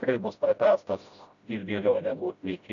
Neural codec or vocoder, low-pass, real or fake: codec, 16 kHz, 0.5 kbps, FreqCodec, smaller model; 7.2 kHz; fake